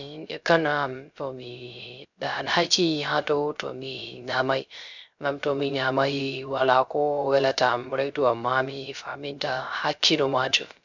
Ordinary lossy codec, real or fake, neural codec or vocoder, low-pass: none; fake; codec, 16 kHz, 0.3 kbps, FocalCodec; 7.2 kHz